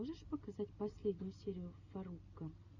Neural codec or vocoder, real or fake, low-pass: none; real; 7.2 kHz